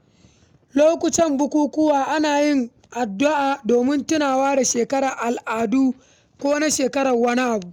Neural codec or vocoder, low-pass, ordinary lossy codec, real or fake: none; 19.8 kHz; none; real